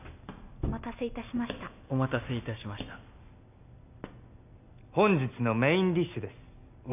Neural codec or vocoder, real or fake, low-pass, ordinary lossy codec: none; real; 3.6 kHz; none